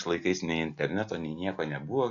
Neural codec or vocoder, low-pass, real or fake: none; 7.2 kHz; real